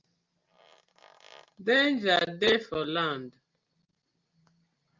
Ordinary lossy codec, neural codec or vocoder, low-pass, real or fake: Opus, 24 kbps; none; 7.2 kHz; real